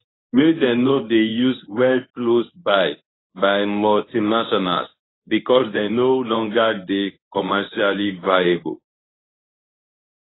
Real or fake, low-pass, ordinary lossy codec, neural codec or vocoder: fake; 7.2 kHz; AAC, 16 kbps; codec, 24 kHz, 0.9 kbps, WavTokenizer, medium speech release version 1